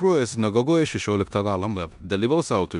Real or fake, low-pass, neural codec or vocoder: fake; 10.8 kHz; codec, 16 kHz in and 24 kHz out, 0.9 kbps, LongCat-Audio-Codec, four codebook decoder